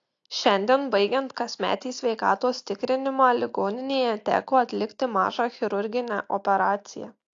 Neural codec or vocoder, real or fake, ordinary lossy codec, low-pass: none; real; AAC, 48 kbps; 7.2 kHz